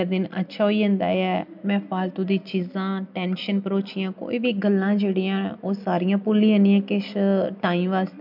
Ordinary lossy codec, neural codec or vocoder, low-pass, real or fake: MP3, 48 kbps; none; 5.4 kHz; real